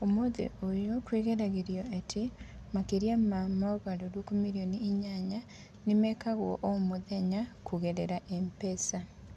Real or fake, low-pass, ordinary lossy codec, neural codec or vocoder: real; none; none; none